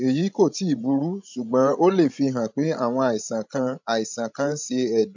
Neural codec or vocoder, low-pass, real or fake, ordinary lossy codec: codec, 16 kHz, 16 kbps, FreqCodec, larger model; 7.2 kHz; fake; MP3, 64 kbps